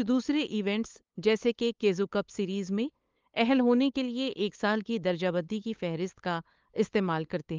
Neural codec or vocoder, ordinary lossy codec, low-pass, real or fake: codec, 16 kHz, 8 kbps, FunCodec, trained on LibriTTS, 25 frames a second; Opus, 32 kbps; 7.2 kHz; fake